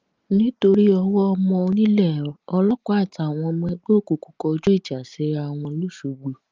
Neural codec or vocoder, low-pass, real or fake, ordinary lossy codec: none; 7.2 kHz; real; Opus, 32 kbps